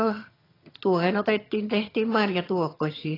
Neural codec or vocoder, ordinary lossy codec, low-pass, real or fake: vocoder, 22.05 kHz, 80 mel bands, HiFi-GAN; AAC, 24 kbps; 5.4 kHz; fake